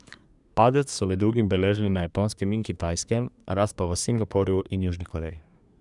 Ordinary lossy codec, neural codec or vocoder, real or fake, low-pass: none; codec, 24 kHz, 1 kbps, SNAC; fake; 10.8 kHz